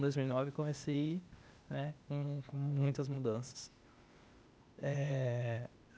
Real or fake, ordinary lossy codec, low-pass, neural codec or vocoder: fake; none; none; codec, 16 kHz, 0.8 kbps, ZipCodec